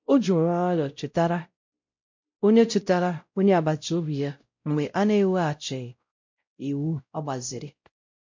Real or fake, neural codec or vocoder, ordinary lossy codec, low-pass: fake; codec, 16 kHz, 0.5 kbps, X-Codec, WavLM features, trained on Multilingual LibriSpeech; MP3, 48 kbps; 7.2 kHz